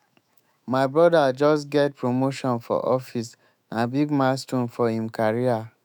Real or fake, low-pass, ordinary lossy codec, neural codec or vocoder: fake; none; none; autoencoder, 48 kHz, 128 numbers a frame, DAC-VAE, trained on Japanese speech